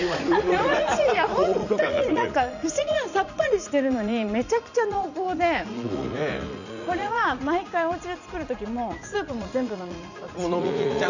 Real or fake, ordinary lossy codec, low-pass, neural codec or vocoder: fake; none; 7.2 kHz; vocoder, 44.1 kHz, 80 mel bands, Vocos